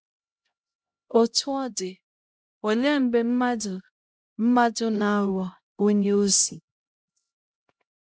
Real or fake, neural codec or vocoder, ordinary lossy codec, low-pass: fake; codec, 16 kHz, 0.5 kbps, X-Codec, HuBERT features, trained on LibriSpeech; none; none